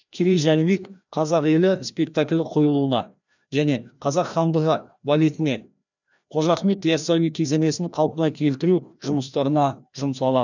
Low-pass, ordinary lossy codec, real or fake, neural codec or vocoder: 7.2 kHz; none; fake; codec, 16 kHz, 1 kbps, FreqCodec, larger model